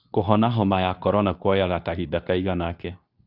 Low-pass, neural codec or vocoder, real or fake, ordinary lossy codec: 5.4 kHz; codec, 24 kHz, 0.9 kbps, WavTokenizer, medium speech release version 2; fake; none